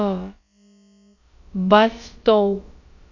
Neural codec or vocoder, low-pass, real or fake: codec, 16 kHz, about 1 kbps, DyCAST, with the encoder's durations; 7.2 kHz; fake